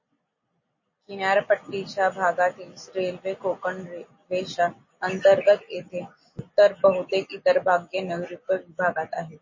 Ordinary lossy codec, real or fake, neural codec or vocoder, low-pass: MP3, 32 kbps; real; none; 7.2 kHz